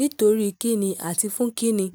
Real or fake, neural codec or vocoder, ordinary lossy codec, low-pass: real; none; none; none